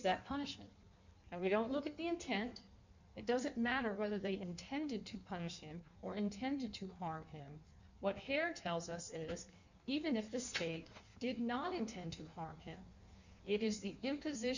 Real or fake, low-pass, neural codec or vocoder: fake; 7.2 kHz; codec, 16 kHz in and 24 kHz out, 1.1 kbps, FireRedTTS-2 codec